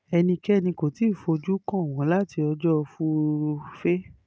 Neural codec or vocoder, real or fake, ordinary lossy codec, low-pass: none; real; none; none